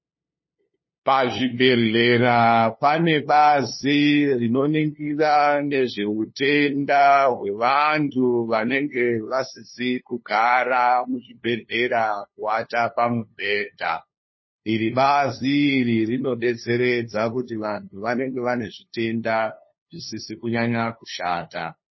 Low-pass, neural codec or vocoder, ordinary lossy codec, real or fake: 7.2 kHz; codec, 16 kHz, 2 kbps, FunCodec, trained on LibriTTS, 25 frames a second; MP3, 24 kbps; fake